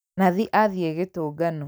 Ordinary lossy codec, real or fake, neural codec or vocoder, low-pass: none; real; none; none